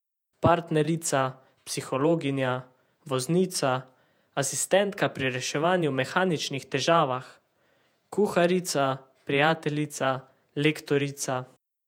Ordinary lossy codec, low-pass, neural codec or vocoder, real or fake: none; 19.8 kHz; vocoder, 48 kHz, 128 mel bands, Vocos; fake